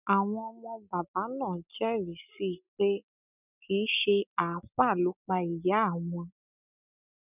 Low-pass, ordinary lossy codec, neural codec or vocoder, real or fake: 3.6 kHz; none; none; real